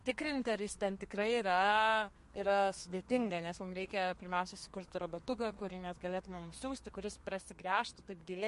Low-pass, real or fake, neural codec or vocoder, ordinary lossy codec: 14.4 kHz; fake; codec, 32 kHz, 1.9 kbps, SNAC; MP3, 48 kbps